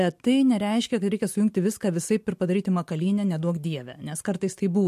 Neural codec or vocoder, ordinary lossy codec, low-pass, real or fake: none; MP3, 64 kbps; 14.4 kHz; real